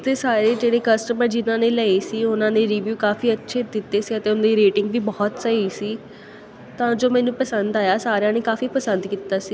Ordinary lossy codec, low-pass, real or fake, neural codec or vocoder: none; none; real; none